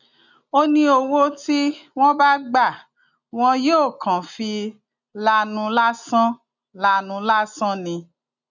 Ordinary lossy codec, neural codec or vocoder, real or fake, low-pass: none; none; real; 7.2 kHz